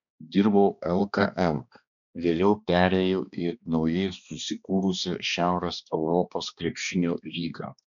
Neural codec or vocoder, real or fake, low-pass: codec, 16 kHz, 2 kbps, X-Codec, HuBERT features, trained on balanced general audio; fake; 7.2 kHz